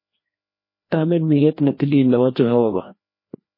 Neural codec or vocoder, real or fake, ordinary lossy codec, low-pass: codec, 16 kHz, 1 kbps, FreqCodec, larger model; fake; MP3, 24 kbps; 5.4 kHz